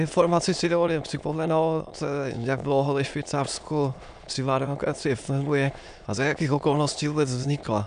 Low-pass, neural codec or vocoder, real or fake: 9.9 kHz; autoencoder, 22.05 kHz, a latent of 192 numbers a frame, VITS, trained on many speakers; fake